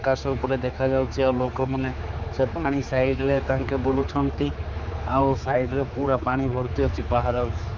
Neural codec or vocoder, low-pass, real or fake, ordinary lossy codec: codec, 16 kHz, 4 kbps, X-Codec, HuBERT features, trained on general audio; none; fake; none